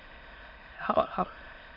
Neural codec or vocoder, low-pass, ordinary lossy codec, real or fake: autoencoder, 22.05 kHz, a latent of 192 numbers a frame, VITS, trained on many speakers; 5.4 kHz; MP3, 32 kbps; fake